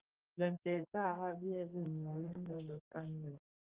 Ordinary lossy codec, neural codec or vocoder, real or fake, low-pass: Opus, 24 kbps; codec, 32 kHz, 1.9 kbps, SNAC; fake; 3.6 kHz